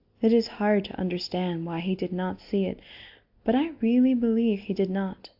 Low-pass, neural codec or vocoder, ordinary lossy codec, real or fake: 5.4 kHz; none; AAC, 48 kbps; real